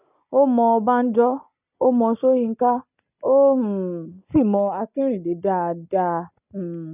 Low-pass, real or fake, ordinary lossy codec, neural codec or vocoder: 3.6 kHz; real; none; none